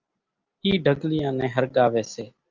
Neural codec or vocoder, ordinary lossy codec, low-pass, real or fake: none; Opus, 24 kbps; 7.2 kHz; real